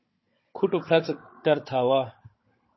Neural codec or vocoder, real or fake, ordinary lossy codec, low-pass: codec, 16 kHz, 16 kbps, FunCodec, trained on Chinese and English, 50 frames a second; fake; MP3, 24 kbps; 7.2 kHz